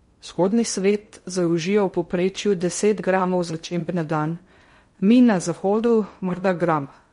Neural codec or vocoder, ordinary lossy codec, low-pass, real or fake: codec, 16 kHz in and 24 kHz out, 0.6 kbps, FocalCodec, streaming, 2048 codes; MP3, 48 kbps; 10.8 kHz; fake